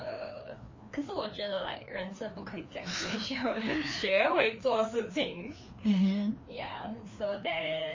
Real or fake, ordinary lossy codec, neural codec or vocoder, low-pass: fake; MP3, 32 kbps; codec, 16 kHz, 2 kbps, FreqCodec, larger model; 7.2 kHz